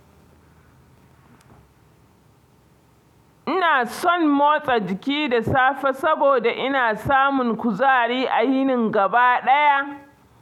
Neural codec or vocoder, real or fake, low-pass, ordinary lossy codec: none; real; 19.8 kHz; none